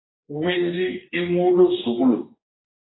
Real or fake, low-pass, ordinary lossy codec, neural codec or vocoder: fake; 7.2 kHz; AAC, 16 kbps; codec, 44.1 kHz, 2.6 kbps, DAC